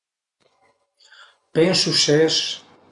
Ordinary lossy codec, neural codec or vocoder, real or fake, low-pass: Opus, 64 kbps; vocoder, 24 kHz, 100 mel bands, Vocos; fake; 10.8 kHz